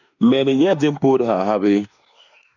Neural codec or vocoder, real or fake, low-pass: autoencoder, 48 kHz, 32 numbers a frame, DAC-VAE, trained on Japanese speech; fake; 7.2 kHz